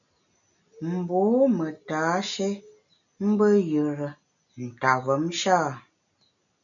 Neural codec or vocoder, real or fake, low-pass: none; real; 7.2 kHz